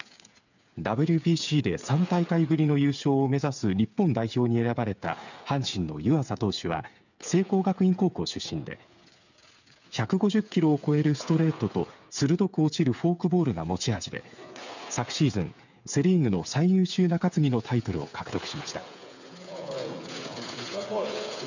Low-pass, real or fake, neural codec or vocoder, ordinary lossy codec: 7.2 kHz; fake; codec, 16 kHz, 8 kbps, FreqCodec, smaller model; none